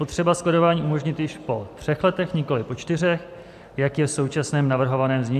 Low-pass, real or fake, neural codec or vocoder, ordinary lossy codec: 14.4 kHz; real; none; AAC, 96 kbps